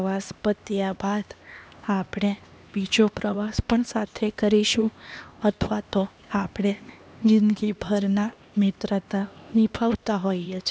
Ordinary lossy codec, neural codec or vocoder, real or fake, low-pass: none; codec, 16 kHz, 2 kbps, X-Codec, HuBERT features, trained on LibriSpeech; fake; none